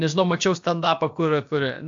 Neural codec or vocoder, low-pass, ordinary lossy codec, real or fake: codec, 16 kHz, about 1 kbps, DyCAST, with the encoder's durations; 7.2 kHz; AAC, 64 kbps; fake